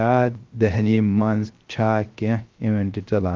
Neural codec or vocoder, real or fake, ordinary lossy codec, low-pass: codec, 16 kHz, 0.3 kbps, FocalCodec; fake; Opus, 32 kbps; 7.2 kHz